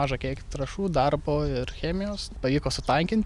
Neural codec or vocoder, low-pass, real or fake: none; 10.8 kHz; real